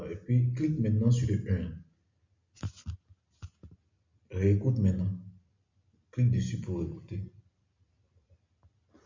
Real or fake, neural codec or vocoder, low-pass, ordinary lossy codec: real; none; 7.2 kHz; MP3, 48 kbps